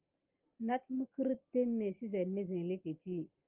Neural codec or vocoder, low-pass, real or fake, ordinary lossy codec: none; 3.6 kHz; real; Opus, 32 kbps